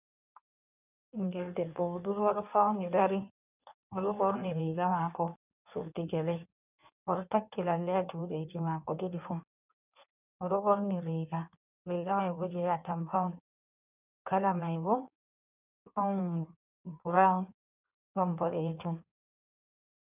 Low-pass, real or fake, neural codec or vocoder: 3.6 kHz; fake; codec, 16 kHz in and 24 kHz out, 1.1 kbps, FireRedTTS-2 codec